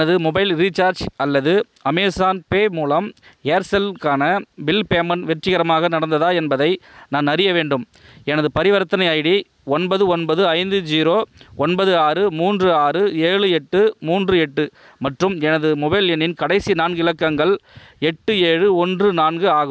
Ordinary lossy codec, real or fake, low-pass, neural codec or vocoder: none; real; none; none